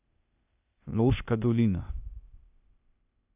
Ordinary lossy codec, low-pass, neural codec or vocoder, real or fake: none; 3.6 kHz; codec, 16 kHz, 0.8 kbps, ZipCodec; fake